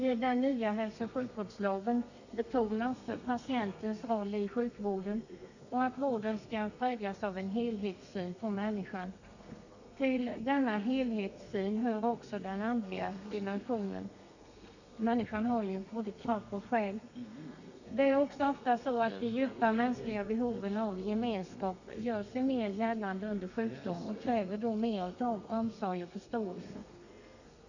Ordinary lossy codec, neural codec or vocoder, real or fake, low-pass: none; codec, 32 kHz, 1.9 kbps, SNAC; fake; 7.2 kHz